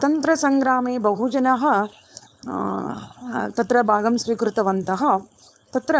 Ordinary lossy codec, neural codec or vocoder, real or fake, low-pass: none; codec, 16 kHz, 4.8 kbps, FACodec; fake; none